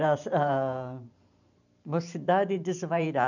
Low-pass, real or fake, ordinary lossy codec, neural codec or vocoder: 7.2 kHz; fake; none; vocoder, 44.1 kHz, 128 mel bands every 512 samples, BigVGAN v2